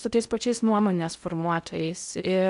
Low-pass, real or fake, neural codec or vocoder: 10.8 kHz; fake; codec, 16 kHz in and 24 kHz out, 0.6 kbps, FocalCodec, streaming, 4096 codes